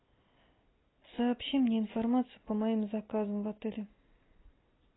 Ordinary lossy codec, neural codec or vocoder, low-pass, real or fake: AAC, 16 kbps; none; 7.2 kHz; real